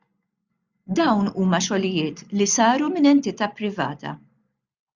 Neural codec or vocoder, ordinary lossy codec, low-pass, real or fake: none; Opus, 64 kbps; 7.2 kHz; real